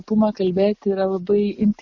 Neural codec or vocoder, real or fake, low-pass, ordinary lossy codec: none; real; 7.2 kHz; Opus, 64 kbps